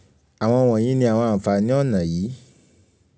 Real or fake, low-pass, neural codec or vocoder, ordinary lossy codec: real; none; none; none